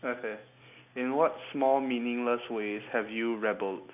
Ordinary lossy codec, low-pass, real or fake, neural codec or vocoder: none; 3.6 kHz; real; none